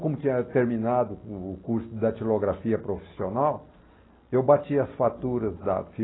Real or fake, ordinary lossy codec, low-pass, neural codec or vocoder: real; AAC, 16 kbps; 7.2 kHz; none